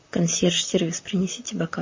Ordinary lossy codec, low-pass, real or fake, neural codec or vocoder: MP3, 32 kbps; 7.2 kHz; fake; vocoder, 44.1 kHz, 128 mel bands, Pupu-Vocoder